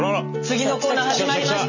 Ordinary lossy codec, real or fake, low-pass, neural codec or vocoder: none; real; 7.2 kHz; none